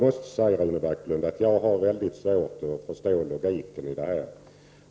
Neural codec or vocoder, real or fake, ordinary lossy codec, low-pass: none; real; none; none